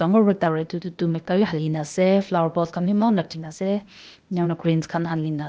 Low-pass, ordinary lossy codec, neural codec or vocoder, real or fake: none; none; codec, 16 kHz, 0.8 kbps, ZipCodec; fake